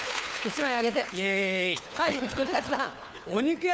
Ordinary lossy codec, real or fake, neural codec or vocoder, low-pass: none; fake; codec, 16 kHz, 4 kbps, FunCodec, trained on LibriTTS, 50 frames a second; none